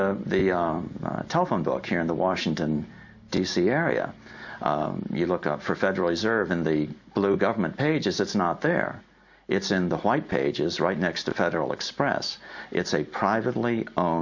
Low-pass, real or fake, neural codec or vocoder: 7.2 kHz; real; none